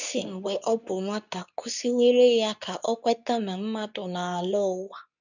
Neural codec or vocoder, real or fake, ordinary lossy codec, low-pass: codec, 24 kHz, 0.9 kbps, WavTokenizer, medium speech release version 2; fake; none; 7.2 kHz